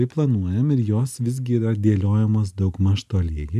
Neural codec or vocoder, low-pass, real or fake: none; 14.4 kHz; real